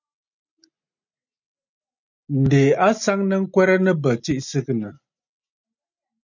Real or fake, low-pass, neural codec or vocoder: real; 7.2 kHz; none